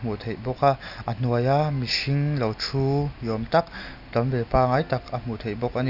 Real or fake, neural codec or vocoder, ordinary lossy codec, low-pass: real; none; none; 5.4 kHz